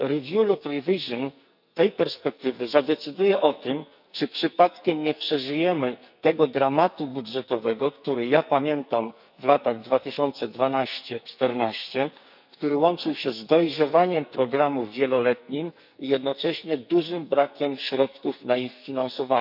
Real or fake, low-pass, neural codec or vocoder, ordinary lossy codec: fake; 5.4 kHz; codec, 44.1 kHz, 2.6 kbps, SNAC; none